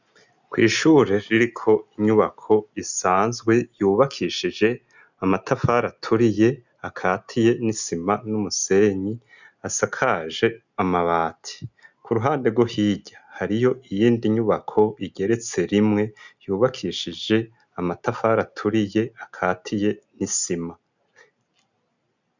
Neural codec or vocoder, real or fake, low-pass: none; real; 7.2 kHz